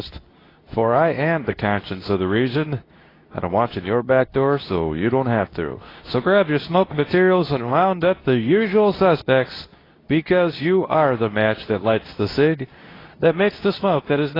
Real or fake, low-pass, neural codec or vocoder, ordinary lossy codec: fake; 5.4 kHz; codec, 24 kHz, 0.9 kbps, WavTokenizer, medium speech release version 2; AAC, 24 kbps